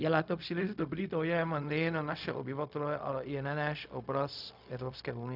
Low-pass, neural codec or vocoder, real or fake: 5.4 kHz; codec, 16 kHz, 0.4 kbps, LongCat-Audio-Codec; fake